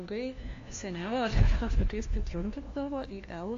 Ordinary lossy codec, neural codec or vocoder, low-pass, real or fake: AAC, 48 kbps; codec, 16 kHz, 1 kbps, FunCodec, trained on LibriTTS, 50 frames a second; 7.2 kHz; fake